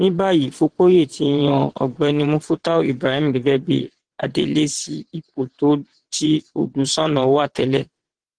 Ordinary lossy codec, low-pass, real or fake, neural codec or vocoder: Opus, 16 kbps; 9.9 kHz; fake; vocoder, 22.05 kHz, 80 mel bands, WaveNeXt